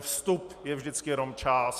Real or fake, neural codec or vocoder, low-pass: real; none; 14.4 kHz